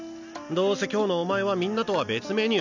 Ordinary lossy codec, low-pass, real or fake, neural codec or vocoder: none; 7.2 kHz; real; none